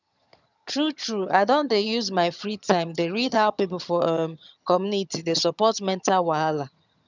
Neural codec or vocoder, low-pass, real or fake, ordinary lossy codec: vocoder, 22.05 kHz, 80 mel bands, HiFi-GAN; 7.2 kHz; fake; none